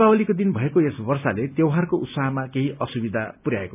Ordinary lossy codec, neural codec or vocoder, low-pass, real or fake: none; none; 3.6 kHz; real